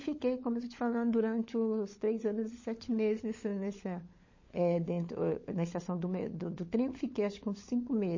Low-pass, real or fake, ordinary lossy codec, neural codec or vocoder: 7.2 kHz; fake; MP3, 32 kbps; codec, 16 kHz, 16 kbps, FunCodec, trained on LibriTTS, 50 frames a second